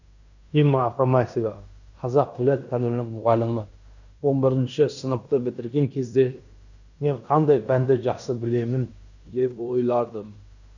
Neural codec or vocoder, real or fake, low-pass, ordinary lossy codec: codec, 16 kHz in and 24 kHz out, 0.9 kbps, LongCat-Audio-Codec, fine tuned four codebook decoder; fake; 7.2 kHz; none